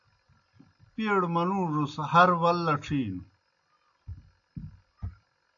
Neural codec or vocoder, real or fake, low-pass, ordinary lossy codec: none; real; 7.2 kHz; AAC, 64 kbps